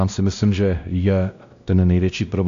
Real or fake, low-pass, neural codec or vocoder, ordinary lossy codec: fake; 7.2 kHz; codec, 16 kHz, 1 kbps, X-Codec, WavLM features, trained on Multilingual LibriSpeech; AAC, 64 kbps